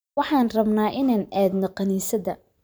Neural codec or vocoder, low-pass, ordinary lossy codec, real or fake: vocoder, 44.1 kHz, 128 mel bands every 512 samples, BigVGAN v2; none; none; fake